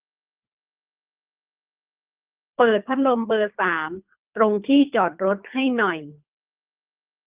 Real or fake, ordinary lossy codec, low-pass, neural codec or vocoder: fake; Opus, 24 kbps; 3.6 kHz; codec, 24 kHz, 3 kbps, HILCodec